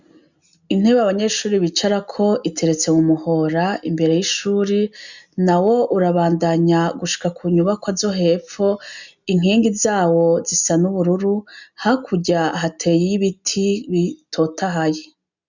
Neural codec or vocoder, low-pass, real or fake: none; 7.2 kHz; real